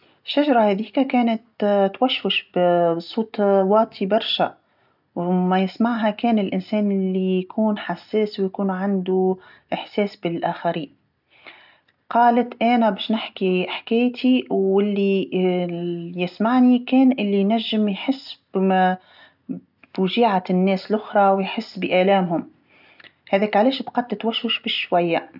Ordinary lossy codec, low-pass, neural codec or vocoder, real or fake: none; 5.4 kHz; none; real